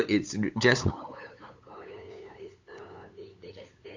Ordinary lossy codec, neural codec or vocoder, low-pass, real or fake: AAC, 48 kbps; codec, 16 kHz, 8 kbps, FunCodec, trained on LibriTTS, 25 frames a second; 7.2 kHz; fake